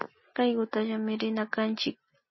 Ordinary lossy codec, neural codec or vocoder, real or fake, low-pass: MP3, 24 kbps; none; real; 7.2 kHz